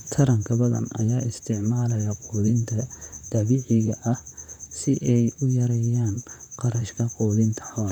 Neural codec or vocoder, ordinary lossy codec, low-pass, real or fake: vocoder, 44.1 kHz, 128 mel bands, Pupu-Vocoder; none; 19.8 kHz; fake